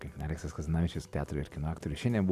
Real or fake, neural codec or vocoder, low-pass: real; none; 14.4 kHz